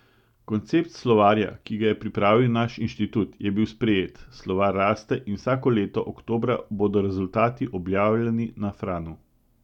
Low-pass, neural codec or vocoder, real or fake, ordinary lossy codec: 19.8 kHz; none; real; none